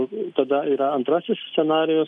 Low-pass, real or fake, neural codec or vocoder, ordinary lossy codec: 10.8 kHz; real; none; AAC, 64 kbps